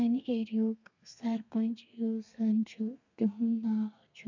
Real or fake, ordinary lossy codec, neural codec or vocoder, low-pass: fake; none; codec, 32 kHz, 1.9 kbps, SNAC; 7.2 kHz